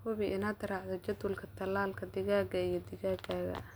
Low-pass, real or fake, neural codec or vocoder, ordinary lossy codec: none; real; none; none